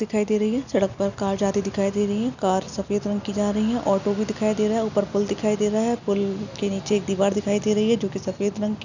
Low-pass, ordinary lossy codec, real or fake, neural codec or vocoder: 7.2 kHz; none; real; none